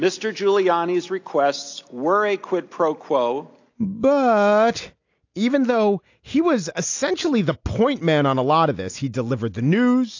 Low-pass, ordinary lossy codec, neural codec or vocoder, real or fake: 7.2 kHz; AAC, 48 kbps; none; real